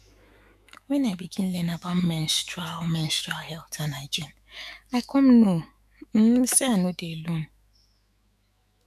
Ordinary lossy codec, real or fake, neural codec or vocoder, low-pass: none; fake; autoencoder, 48 kHz, 128 numbers a frame, DAC-VAE, trained on Japanese speech; 14.4 kHz